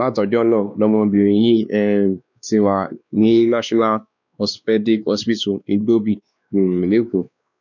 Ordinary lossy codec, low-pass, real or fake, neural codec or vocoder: none; 7.2 kHz; fake; codec, 16 kHz, 2 kbps, X-Codec, WavLM features, trained on Multilingual LibriSpeech